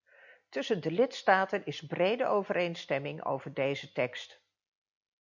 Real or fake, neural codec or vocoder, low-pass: real; none; 7.2 kHz